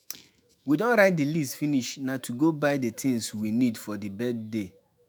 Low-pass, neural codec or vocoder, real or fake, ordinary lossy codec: none; autoencoder, 48 kHz, 128 numbers a frame, DAC-VAE, trained on Japanese speech; fake; none